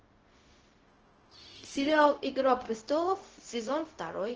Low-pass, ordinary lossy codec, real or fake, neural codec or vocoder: 7.2 kHz; Opus, 16 kbps; fake; codec, 16 kHz, 0.4 kbps, LongCat-Audio-Codec